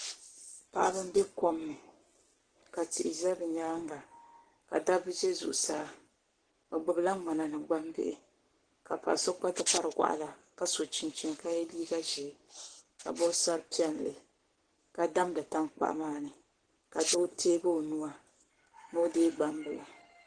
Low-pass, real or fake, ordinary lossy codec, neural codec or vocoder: 9.9 kHz; fake; Opus, 16 kbps; codec, 44.1 kHz, 7.8 kbps, Pupu-Codec